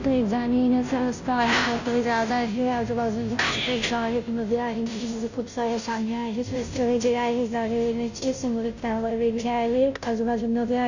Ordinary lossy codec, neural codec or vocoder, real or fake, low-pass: none; codec, 16 kHz, 0.5 kbps, FunCodec, trained on Chinese and English, 25 frames a second; fake; 7.2 kHz